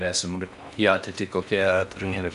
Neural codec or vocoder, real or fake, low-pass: codec, 16 kHz in and 24 kHz out, 0.6 kbps, FocalCodec, streaming, 4096 codes; fake; 10.8 kHz